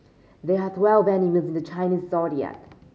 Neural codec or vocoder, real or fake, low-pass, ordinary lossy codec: none; real; none; none